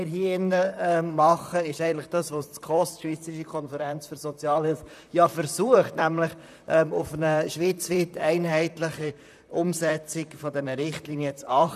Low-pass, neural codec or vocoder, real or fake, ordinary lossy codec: 14.4 kHz; vocoder, 44.1 kHz, 128 mel bands, Pupu-Vocoder; fake; none